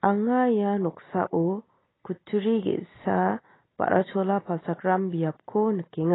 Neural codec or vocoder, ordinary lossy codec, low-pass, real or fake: none; AAC, 16 kbps; 7.2 kHz; real